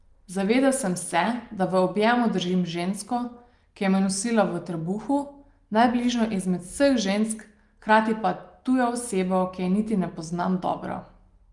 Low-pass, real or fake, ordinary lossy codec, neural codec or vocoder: 10.8 kHz; real; Opus, 32 kbps; none